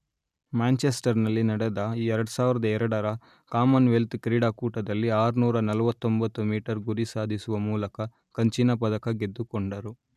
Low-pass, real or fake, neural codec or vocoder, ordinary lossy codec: 14.4 kHz; real; none; none